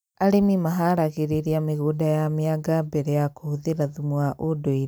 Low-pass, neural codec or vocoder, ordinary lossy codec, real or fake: none; none; none; real